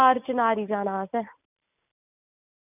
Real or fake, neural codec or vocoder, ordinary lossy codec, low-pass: real; none; none; 3.6 kHz